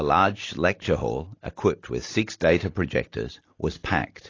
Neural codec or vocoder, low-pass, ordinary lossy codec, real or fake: none; 7.2 kHz; AAC, 32 kbps; real